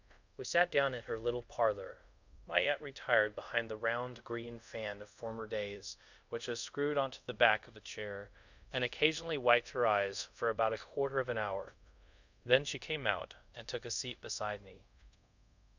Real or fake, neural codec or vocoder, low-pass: fake; codec, 24 kHz, 0.5 kbps, DualCodec; 7.2 kHz